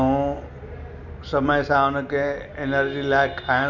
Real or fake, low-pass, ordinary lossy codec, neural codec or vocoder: real; 7.2 kHz; none; none